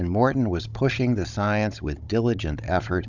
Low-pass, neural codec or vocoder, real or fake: 7.2 kHz; codec, 16 kHz, 16 kbps, FunCodec, trained on Chinese and English, 50 frames a second; fake